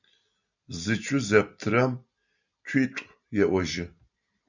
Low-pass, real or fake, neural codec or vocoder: 7.2 kHz; real; none